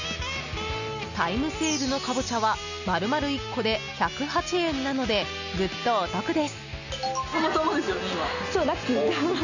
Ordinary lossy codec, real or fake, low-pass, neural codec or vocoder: none; real; 7.2 kHz; none